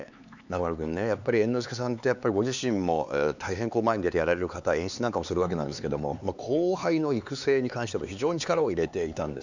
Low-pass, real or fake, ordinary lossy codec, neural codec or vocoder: 7.2 kHz; fake; none; codec, 16 kHz, 4 kbps, X-Codec, HuBERT features, trained on LibriSpeech